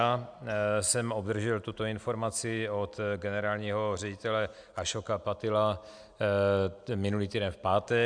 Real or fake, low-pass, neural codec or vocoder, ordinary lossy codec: real; 9.9 kHz; none; MP3, 96 kbps